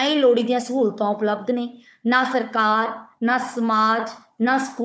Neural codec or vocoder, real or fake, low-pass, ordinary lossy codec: codec, 16 kHz, 4 kbps, FunCodec, trained on Chinese and English, 50 frames a second; fake; none; none